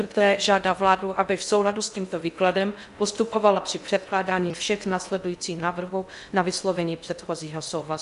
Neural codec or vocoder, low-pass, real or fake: codec, 16 kHz in and 24 kHz out, 0.6 kbps, FocalCodec, streaming, 2048 codes; 10.8 kHz; fake